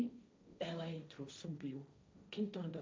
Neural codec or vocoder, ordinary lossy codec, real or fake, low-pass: codec, 16 kHz, 1.1 kbps, Voila-Tokenizer; none; fake; none